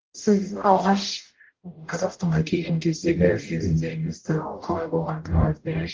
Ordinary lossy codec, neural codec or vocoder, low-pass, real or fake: Opus, 16 kbps; codec, 44.1 kHz, 0.9 kbps, DAC; 7.2 kHz; fake